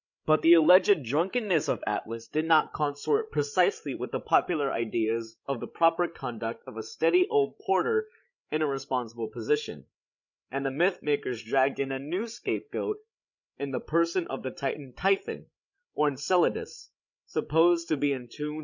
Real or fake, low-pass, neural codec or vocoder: fake; 7.2 kHz; codec, 16 kHz, 16 kbps, FreqCodec, larger model